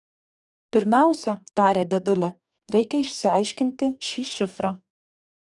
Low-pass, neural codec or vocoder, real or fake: 10.8 kHz; codec, 44.1 kHz, 2.6 kbps, DAC; fake